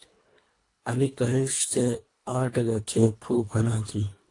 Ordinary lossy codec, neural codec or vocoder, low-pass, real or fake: AAC, 48 kbps; codec, 24 kHz, 1.5 kbps, HILCodec; 10.8 kHz; fake